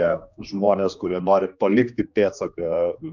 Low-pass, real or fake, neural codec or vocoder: 7.2 kHz; fake; codec, 16 kHz, 2 kbps, X-Codec, HuBERT features, trained on general audio